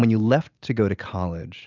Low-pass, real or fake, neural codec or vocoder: 7.2 kHz; real; none